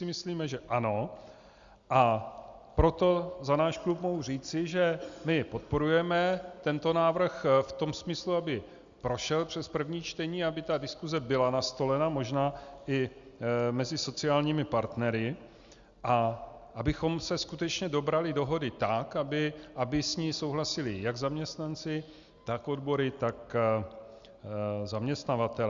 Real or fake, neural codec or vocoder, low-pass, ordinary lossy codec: real; none; 7.2 kHz; Opus, 64 kbps